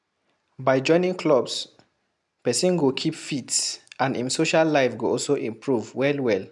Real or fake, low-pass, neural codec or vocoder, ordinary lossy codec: real; 10.8 kHz; none; none